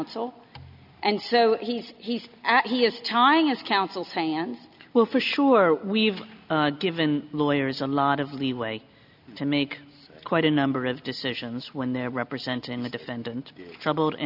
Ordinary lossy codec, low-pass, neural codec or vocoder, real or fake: MP3, 48 kbps; 5.4 kHz; none; real